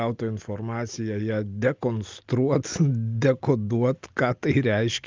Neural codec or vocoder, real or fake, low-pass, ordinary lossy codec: none; real; 7.2 kHz; Opus, 32 kbps